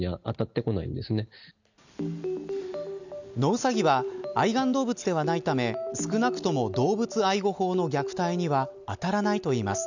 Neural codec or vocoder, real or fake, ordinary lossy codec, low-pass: none; real; none; 7.2 kHz